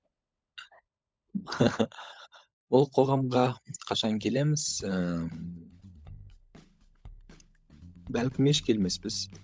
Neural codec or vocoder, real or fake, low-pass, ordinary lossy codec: codec, 16 kHz, 16 kbps, FunCodec, trained on LibriTTS, 50 frames a second; fake; none; none